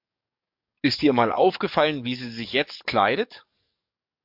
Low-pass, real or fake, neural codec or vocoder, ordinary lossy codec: 5.4 kHz; fake; codec, 44.1 kHz, 7.8 kbps, DAC; MP3, 48 kbps